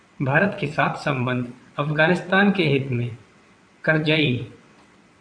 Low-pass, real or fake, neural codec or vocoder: 9.9 kHz; fake; vocoder, 22.05 kHz, 80 mel bands, WaveNeXt